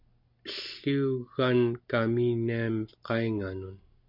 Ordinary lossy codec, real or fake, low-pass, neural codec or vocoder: MP3, 48 kbps; real; 5.4 kHz; none